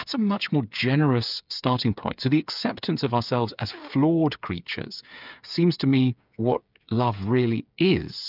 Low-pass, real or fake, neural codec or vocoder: 5.4 kHz; fake; codec, 16 kHz, 8 kbps, FreqCodec, smaller model